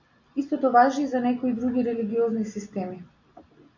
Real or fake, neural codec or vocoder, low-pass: real; none; 7.2 kHz